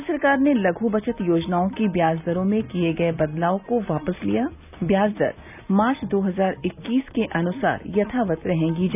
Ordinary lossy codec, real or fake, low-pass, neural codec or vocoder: none; real; 3.6 kHz; none